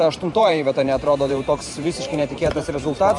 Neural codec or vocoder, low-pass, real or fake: vocoder, 44.1 kHz, 128 mel bands every 512 samples, BigVGAN v2; 10.8 kHz; fake